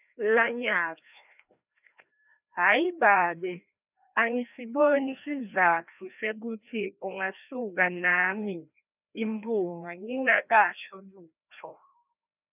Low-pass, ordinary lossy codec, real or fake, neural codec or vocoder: 3.6 kHz; none; fake; codec, 16 kHz, 1 kbps, FreqCodec, larger model